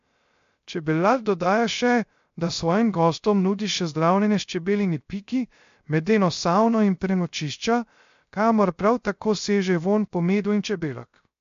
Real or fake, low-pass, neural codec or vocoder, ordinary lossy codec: fake; 7.2 kHz; codec, 16 kHz, 0.3 kbps, FocalCodec; MP3, 48 kbps